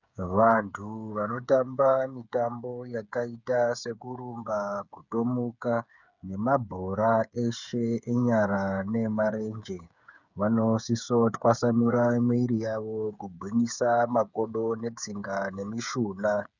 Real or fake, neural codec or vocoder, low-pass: fake; codec, 16 kHz, 8 kbps, FreqCodec, smaller model; 7.2 kHz